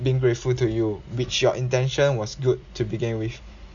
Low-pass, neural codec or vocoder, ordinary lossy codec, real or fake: 7.2 kHz; none; none; real